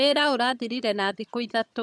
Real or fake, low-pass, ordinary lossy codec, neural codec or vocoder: fake; none; none; vocoder, 22.05 kHz, 80 mel bands, HiFi-GAN